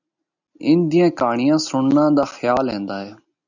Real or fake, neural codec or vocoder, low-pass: real; none; 7.2 kHz